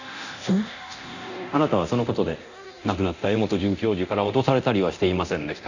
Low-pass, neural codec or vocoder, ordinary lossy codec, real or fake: 7.2 kHz; codec, 24 kHz, 0.9 kbps, DualCodec; none; fake